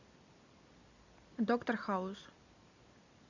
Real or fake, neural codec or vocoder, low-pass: real; none; 7.2 kHz